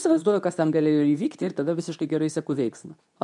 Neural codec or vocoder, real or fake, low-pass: codec, 24 kHz, 0.9 kbps, WavTokenizer, medium speech release version 2; fake; 10.8 kHz